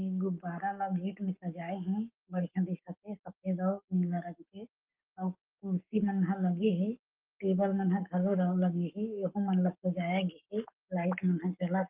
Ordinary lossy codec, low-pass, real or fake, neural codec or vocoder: Opus, 32 kbps; 3.6 kHz; fake; codec, 44.1 kHz, 7.8 kbps, Pupu-Codec